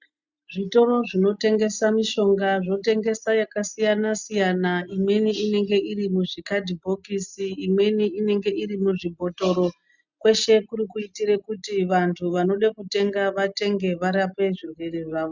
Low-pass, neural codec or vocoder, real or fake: 7.2 kHz; none; real